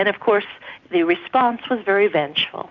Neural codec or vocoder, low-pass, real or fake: none; 7.2 kHz; real